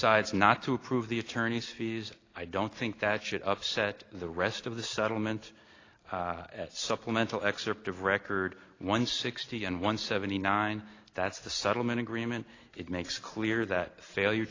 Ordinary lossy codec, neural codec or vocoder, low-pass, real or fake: AAC, 32 kbps; none; 7.2 kHz; real